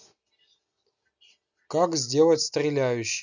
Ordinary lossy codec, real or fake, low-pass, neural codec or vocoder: none; real; 7.2 kHz; none